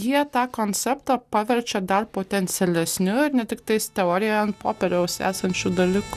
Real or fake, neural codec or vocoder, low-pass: real; none; 14.4 kHz